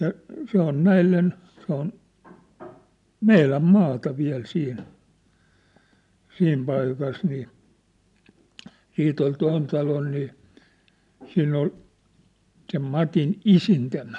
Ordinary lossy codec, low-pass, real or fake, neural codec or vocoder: none; 10.8 kHz; real; none